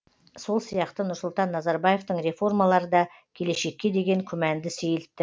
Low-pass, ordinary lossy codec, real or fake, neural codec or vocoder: none; none; real; none